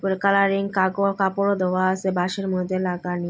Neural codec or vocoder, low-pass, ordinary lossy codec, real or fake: none; none; none; real